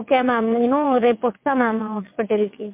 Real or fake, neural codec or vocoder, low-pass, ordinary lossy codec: fake; vocoder, 22.05 kHz, 80 mel bands, WaveNeXt; 3.6 kHz; MP3, 32 kbps